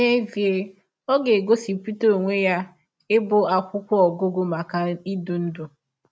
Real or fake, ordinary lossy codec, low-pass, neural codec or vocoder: real; none; none; none